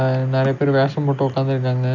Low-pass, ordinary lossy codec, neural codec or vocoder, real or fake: 7.2 kHz; none; none; real